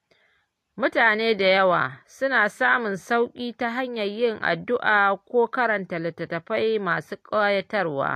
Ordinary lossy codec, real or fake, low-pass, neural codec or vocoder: AAC, 64 kbps; real; 14.4 kHz; none